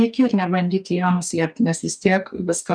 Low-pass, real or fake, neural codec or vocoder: 9.9 kHz; fake; codec, 44.1 kHz, 2.6 kbps, DAC